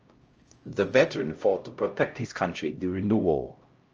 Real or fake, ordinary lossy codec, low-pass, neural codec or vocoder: fake; Opus, 24 kbps; 7.2 kHz; codec, 16 kHz, 0.5 kbps, X-Codec, HuBERT features, trained on LibriSpeech